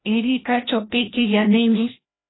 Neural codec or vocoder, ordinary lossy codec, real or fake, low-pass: codec, 16 kHz, 1 kbps, FreqCodec, larger model; AAC, 16 kbps; fake; 7.2 kHz